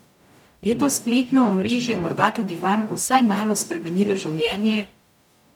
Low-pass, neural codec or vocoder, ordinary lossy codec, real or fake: 19.8 kHz; codec, 44.1 kHz, 0.9 kbps, DAC; none; fake